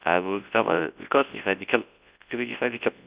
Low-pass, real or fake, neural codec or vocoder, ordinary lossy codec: 3.6 kHz; fake; codec, 24 kHz, 0.9 kbps, WavTokenizer, large speech release; Opus, 64 kbps